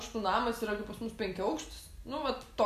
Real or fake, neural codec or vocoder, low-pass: real; none; 14.4 kHz